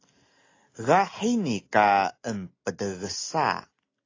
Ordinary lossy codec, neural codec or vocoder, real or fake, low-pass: AAC, 32 kbps; none; real; 7.2 kHz